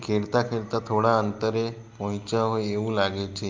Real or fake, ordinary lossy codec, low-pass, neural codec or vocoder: real; Opus, 32 kbps; 7.2 kHz; none